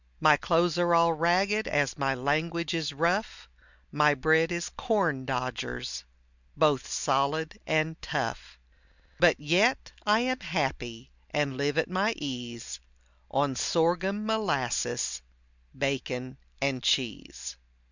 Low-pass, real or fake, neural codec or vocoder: 7.2 kHz; real; none